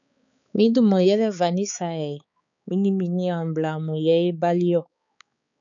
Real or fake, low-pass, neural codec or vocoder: fake; 7.2 kHz; codec, 16 kHz, 4 kbps, X-Codec, HuBERT features, trained on balanced general audio